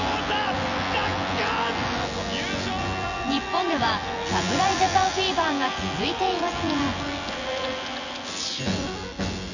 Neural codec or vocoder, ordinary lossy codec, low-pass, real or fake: vocoder, 24 kHz, 100 mel bands, Vocos; none; 7.2 kHz; fake